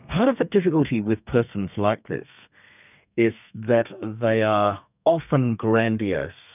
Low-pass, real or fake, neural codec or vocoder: 3.6 kHz; fake; codec, 32 kHz, 1.9 kbps, SNAC